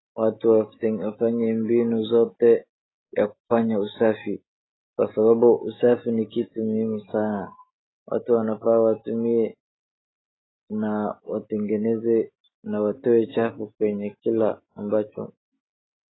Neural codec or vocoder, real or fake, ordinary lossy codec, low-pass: none; real; AAC, 16 kbps; 7.2 kHz